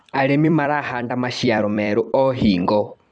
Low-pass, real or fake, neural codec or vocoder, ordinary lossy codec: 9.9 kHz; fake; vocoder, 44.1 kHz, 128 mel bands every 256 samples, BigVGAN v2; none